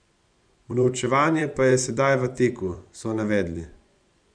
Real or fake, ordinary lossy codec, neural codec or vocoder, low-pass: fake; none; vocoder, 24 kHz, 100 mel bands, Vocos; 9.9 kHz